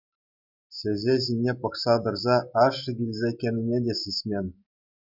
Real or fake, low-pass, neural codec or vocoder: real; 5.4 kHz; none